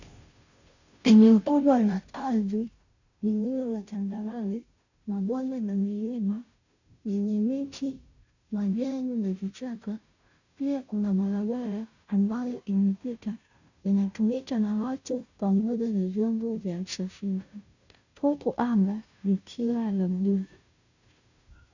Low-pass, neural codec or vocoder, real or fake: 7.2 kHz; codec, 16 kHz, 0.5 kbps, FunCodec, trained on Chinese and English, 25 frames a second; fake